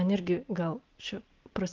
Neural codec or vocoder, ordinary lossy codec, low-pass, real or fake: none; Opus, 16 kbps; 7.2 kHz; real